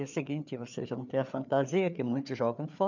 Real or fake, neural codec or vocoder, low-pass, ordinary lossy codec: fake; codec, 16 kHz, 4 kbps, FreqCodec, larger model; 7.2 kHz; none